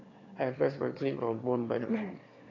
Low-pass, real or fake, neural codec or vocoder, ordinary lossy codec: 7.2 kHz; fake; autoencoder, 22.05 kHz, a latent of 192 numbers a frame, VITS, trained on one speaker; AAC, 32 kbps